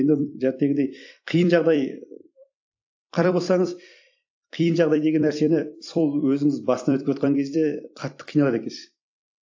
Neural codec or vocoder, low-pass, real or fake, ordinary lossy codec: vocoder, 44.1 kHz, 128 mel bands every 256 samples, BigVGAN v2; 7.2 kHz; fake; AAC, 48 kbps